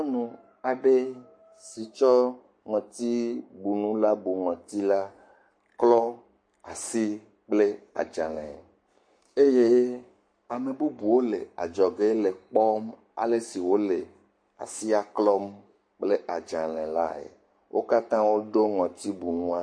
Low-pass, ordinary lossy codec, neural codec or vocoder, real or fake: 9.9 kHz; MP3, 48 kbps; codec, 44.1 kHz, 7.8 kbps, Pupu-Codec; fake